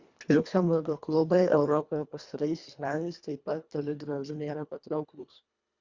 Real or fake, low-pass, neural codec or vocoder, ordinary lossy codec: fake; 7.2 kHz; codec, 24 kHz, 1.5 kbps, HILCodec; Opus, 64 kbps